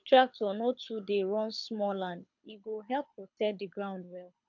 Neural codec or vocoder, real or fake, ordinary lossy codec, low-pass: codec, 24 kHz, 6 kbps, HILCodec; fake; MP3, 64 kbps; 7.2 kHz